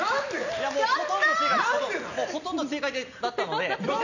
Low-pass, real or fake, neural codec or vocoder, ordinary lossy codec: 7.2 kHz; real; none; none